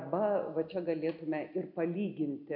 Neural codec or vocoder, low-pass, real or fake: none; 5.4 kHz; real